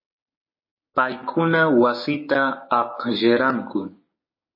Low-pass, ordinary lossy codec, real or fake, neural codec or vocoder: 5.4 kHz; MP3, 24 kbps; fake; codec, 16 kHz, 6 kbps, DAC